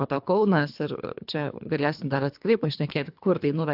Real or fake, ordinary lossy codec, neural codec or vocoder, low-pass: fake; AAC, 48 kbps; codec, 24 kHz, 3 kbps, HILCodec; 5.4 kHz